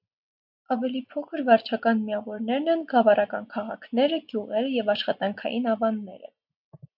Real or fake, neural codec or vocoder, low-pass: real; none; 5.4 kHz